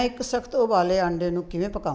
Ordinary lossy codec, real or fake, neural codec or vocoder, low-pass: none; real; none; none